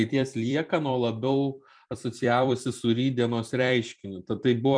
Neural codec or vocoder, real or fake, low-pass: vocoder, 44.1 kHz, 128 mel bands every 256 samples, BigVGAN v2; fake; 9.9 kHz